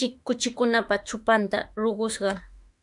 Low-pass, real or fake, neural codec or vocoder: 10.8 kHz; fake; autoencoder, 48 kHz, 32 numbers a frame, DAC-VAE, trained on Japanese speech